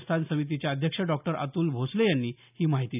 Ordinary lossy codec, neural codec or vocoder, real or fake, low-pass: none; none; real; 3.6 kHz